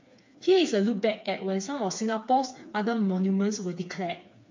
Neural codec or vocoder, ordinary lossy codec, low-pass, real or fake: codec, 16 kHz, 4 kbps, FreqCodec, smaller model; MP3, 48 kbps; 7.2 kHz; fake